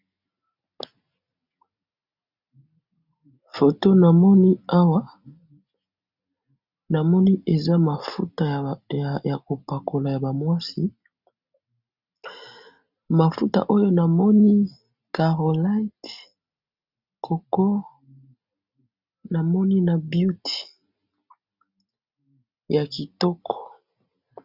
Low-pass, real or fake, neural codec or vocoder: 5.4 kHz; real; none